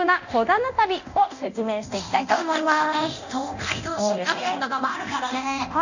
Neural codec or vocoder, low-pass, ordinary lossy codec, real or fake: codec, 24 kHz, 0.9 kbps, DualCodec; 7.2 kHz; none; fake